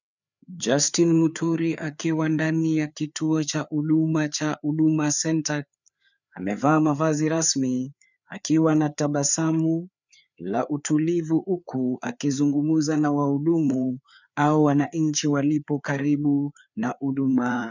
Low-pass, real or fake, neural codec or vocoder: 7.2 kHz; fake; codec, 16 kHz, 4 kbps, FreqCodec, larger model